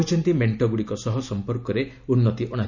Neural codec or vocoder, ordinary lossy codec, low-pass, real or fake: none; none; 7.2 kHz; real